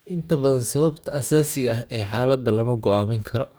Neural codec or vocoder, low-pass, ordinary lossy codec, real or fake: codec, 44.1 kHz, 2.6 kbps, DAC; none; none; fake